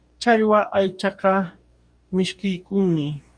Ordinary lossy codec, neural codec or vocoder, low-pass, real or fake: Opus, 64 kbps; codec, 44.1 kHz, 2.6 kbps, DAC; 9.9 kHz; fake